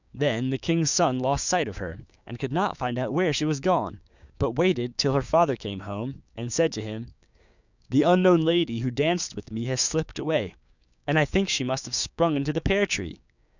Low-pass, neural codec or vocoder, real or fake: 7.2 kHz; codec, 16 kHz, 6 kbps, DAC; fake